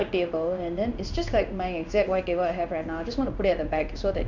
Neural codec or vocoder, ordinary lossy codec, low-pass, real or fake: codec, 16 kHz in and 24 kHz out, 1 kbps, XY-Tokenizer; none; 7.2 kHz; fake